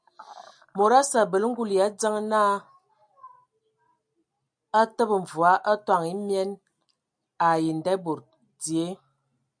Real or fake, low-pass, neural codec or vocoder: real; 9.9 kHz; none